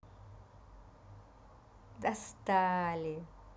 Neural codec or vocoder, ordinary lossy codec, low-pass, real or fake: none; none; none; real